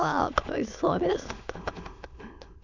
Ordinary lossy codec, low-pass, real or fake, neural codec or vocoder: none; 7.2 kHz; fake; autoencoder, 22.05 kHz, a latent of 192 numbers a frame, VITS, trained on many speakers